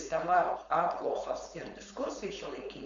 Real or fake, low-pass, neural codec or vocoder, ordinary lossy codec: fake; 7.2 kHz; codec, 16 kHz, 4.8 kbps, FACodec; AAC, 64 kbps